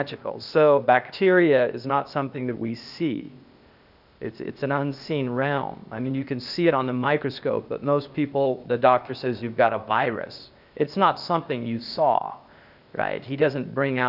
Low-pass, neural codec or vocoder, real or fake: 5.4 kHz; codec, 16 kHz, 0.8 kbps, ZipCodec; fake